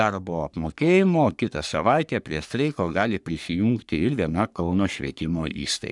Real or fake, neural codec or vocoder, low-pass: fake; codec, 44.1 kHz, 3.4 kbps, Pupu-Codec; 10.8 kHz